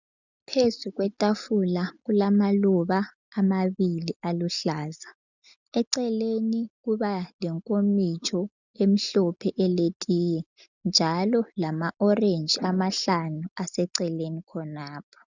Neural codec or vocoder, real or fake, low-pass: none; real; 7.2 kHz